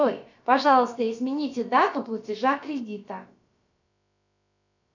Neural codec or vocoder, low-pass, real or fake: codec, 16 kHz, about 1 kbps, DyCAST, with the encoder's durations; 7.2 kHz; fake